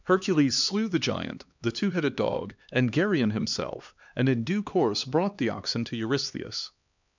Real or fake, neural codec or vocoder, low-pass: fake; codec, 16 kHz, 2 kbps, X-Codec, HuBERT features, trained on LibriSpeech; 7.2 kHz